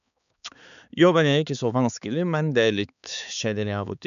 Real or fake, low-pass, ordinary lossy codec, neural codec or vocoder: fake; 7.2 kHz; none; codec, 16 kHz, 4 kbps, X-Codec, HuBERT features, trained on balanced general audio